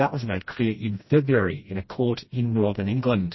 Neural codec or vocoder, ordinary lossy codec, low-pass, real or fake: codec, 16 kHz, 1 kbps, FreqCodec, smaller model; MP3, 24 kbps; 7.2 kHz; fake